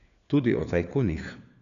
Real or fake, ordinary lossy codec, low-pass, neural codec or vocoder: fake; none; 7.2 kHz; codec, 16 kHz, 4 kbps, FunCodec, trained on LibriTTS, 50 frames a second